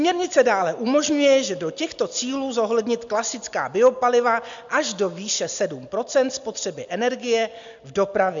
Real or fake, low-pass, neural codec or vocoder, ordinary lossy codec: real; 7.2 kHz; none; MP3, 64 kbps